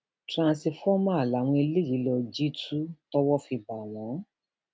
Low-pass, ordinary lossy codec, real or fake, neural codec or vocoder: none; none; real; none